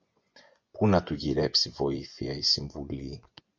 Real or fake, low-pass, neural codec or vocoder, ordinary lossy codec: fake; 7.2 kHz; vocoder, 24 kHz, 100 mel bands, Vocos; MP3, 48 kbps